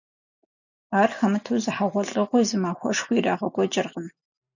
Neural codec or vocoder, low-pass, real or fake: none; 7.2 kHz; real